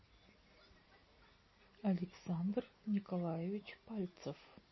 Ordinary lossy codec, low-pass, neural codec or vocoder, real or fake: MP3, 24 kbps; 7.2 kHz; none; real